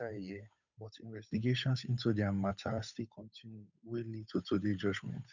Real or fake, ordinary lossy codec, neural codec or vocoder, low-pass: fake; none; codec, 16 kHz, 8 kbps, FunCodec, trained on Chinese and English, 25 frames a second; 7.2 kHz